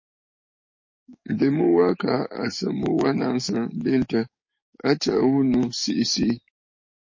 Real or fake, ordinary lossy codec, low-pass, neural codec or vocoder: fake; MP3, 32 kbps; 7.2 kHz; codec, 44.1 kHz, 7.8 kbps, DAC